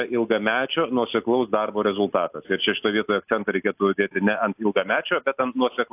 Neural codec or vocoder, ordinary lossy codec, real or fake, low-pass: none; AAC, 32 kbps; real; 3.6 kHz